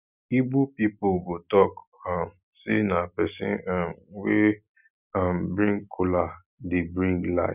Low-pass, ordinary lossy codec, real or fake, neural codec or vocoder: 3.6 kHz; none; real; none